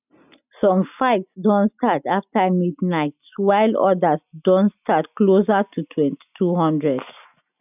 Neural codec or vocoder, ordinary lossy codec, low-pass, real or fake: none; none; 3.6 kHz; real